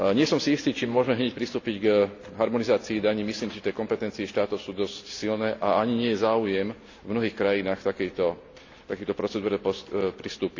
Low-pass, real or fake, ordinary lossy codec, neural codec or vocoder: 7.2 kHz; real; AAC, 48 kbps; none